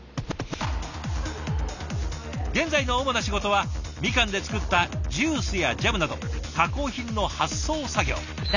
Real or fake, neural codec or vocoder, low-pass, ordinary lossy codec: real; none; 7.2 kHz; none